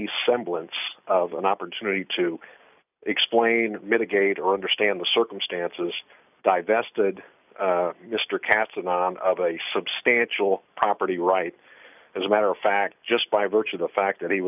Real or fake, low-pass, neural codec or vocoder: real; 3.6 kHz; none